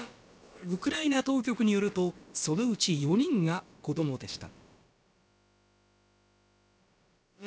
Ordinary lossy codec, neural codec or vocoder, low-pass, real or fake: none; codec, 16 kHz, about 1 kbps, DyCAST, with the encoder's durations; none; fake